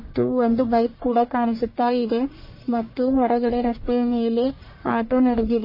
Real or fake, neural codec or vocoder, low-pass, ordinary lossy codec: fake; codec, 44.1 kHz, 1.7 kbps, Pupu-Codec; 5.4 kHz; MP3, 24 kbps